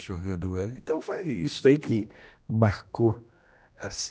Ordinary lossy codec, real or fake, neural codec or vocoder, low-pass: none; fake; codec, 16 kHz, 1 kbps, X-Codec, HuBERT features, trained on general audio; none